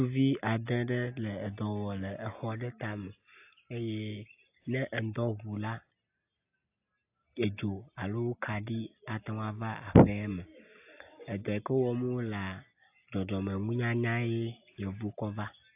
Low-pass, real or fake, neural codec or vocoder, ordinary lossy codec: 3.6 kHz; real; none; AAC, 32 kbps